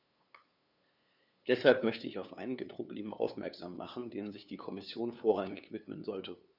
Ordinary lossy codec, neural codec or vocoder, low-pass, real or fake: none; codec, 16 kHz, 2 kbps, FunCodec, trained on LibriTTS, 25 frames a second; 5.4 kHz; fake